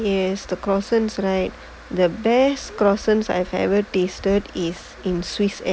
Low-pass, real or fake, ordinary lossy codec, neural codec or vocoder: none; real; none; none